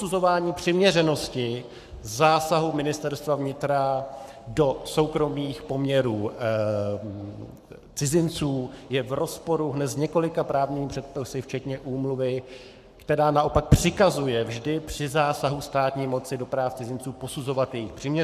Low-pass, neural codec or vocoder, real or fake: 14.4 kHz; codec, 44.1 kHz, 7.8 kbps, Pupu-Codec; fake